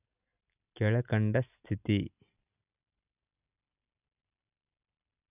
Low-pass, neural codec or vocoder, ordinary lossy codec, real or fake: 3.6 kHz; none; none; real